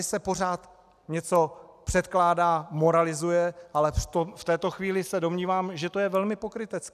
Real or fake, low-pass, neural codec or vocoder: real; 14.4 kHz; none